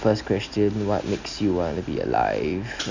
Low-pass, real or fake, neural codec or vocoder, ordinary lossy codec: 7.2 kHz; real; none; none